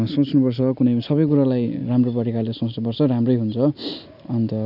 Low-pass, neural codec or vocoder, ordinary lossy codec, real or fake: 5.4 kHz; none; none; real